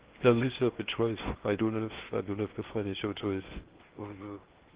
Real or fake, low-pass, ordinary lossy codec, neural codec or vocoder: fake; 3.6 kHz; Opus, 16 kbps; codec, 16 kHz in and 24 kHz out, 0.8 kbps, FocalCodec, streaming, 65536 codes